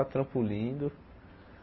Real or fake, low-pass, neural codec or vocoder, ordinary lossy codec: real; 7.2 kHz; none; MP3, 24 kbps